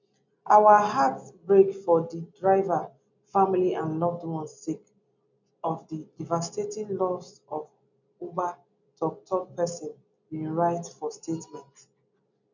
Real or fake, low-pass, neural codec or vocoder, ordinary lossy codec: real; 7.2 kHz; none; none